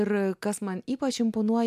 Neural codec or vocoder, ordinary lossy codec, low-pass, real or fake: none; MP3, 96 kbps; 14.4 kHz; real